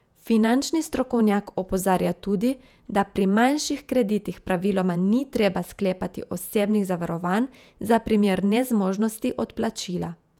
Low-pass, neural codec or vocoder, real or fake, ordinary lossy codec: 19.8 kHz; vocoder, 48 kHz, 128 mel bands, Vocos; fake; none